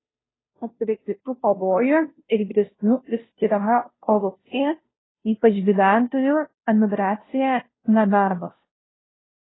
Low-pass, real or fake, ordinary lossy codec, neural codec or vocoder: 7.2 kHz; fake; AAC, 16 kbps; codec, 16 kHz, 0.5 kbps, FunCodec, trained on Chinese and English, 25 frames a second